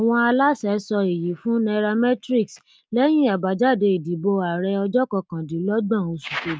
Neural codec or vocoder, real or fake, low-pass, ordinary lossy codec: none; real; none; none